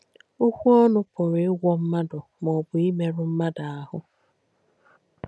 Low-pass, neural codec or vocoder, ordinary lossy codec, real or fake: none; none; none; real